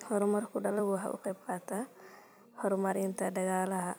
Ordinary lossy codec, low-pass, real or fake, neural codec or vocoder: none; none; fake; vocoder, 44.1 kHz, 128 mel bands every 256 samples, BigVGAN v2